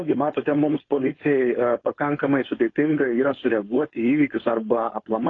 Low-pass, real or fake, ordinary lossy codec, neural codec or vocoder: 7.2 kHz; fake; AAC, 32 kbps; codec, 16 kHz, 4.8 kbps, FACodec